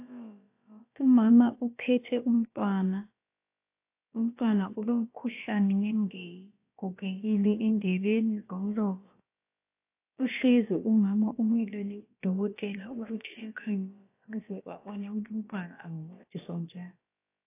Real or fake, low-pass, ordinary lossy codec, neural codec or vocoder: fake; 3.6 kHz; AAC, 24 kbps; codec, 16 kHz, about 1 kbps, DyCAST, with the encoder's durations